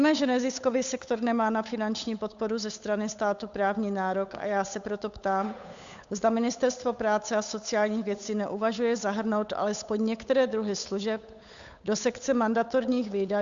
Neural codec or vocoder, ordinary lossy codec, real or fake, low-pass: codec, 16 kHz, 8 kbps, FunCodec, trained on Chinese and English, 25 frames a second; Opus, 64 kbps; fake; 7.2 kHz